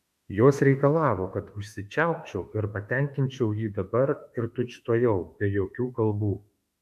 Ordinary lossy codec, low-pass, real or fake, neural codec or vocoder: AAC, 96 kbps; 14.4 kHz; fake; autoencoder, 48 kHz, 32 numbers a frame, DAC-VAE, trained on Japanese speech